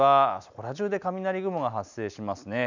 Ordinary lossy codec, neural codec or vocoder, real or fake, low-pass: none; none; real; 7.2 kHz